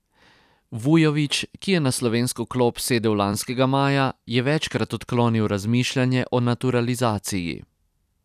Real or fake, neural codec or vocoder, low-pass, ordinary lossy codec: fake; vocoder, 44.1 kHz, 128 mel bands every 256 samples, BigVGAN v2; 14.4 kHz; none